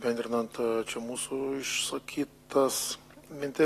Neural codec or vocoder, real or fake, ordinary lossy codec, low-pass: none; real; AAC, 48 kbps; 14.4 kHz